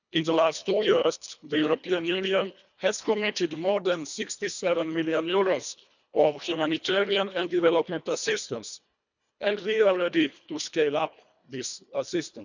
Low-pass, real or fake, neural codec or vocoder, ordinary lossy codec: 7.2 kHz; fake; codec, 24 kHz, 1.5 kbps, HILCodec; none